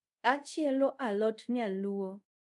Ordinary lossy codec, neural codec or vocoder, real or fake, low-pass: none; codec, 24 kHz, 0.5 kbps, DualCodec; fake; 10.8 kHz